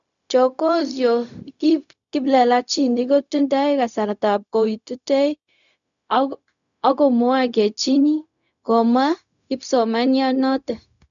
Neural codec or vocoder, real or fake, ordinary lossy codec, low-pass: codec, 16 kHz, 0.4 kbps, LongCat-Audio-Codec; fake; none; 7.2 kHz